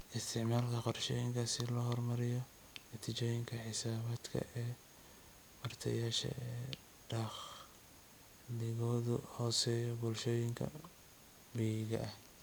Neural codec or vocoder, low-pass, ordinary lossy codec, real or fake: none; none; none; real